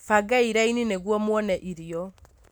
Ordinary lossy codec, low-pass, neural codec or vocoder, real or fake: none; none; none; real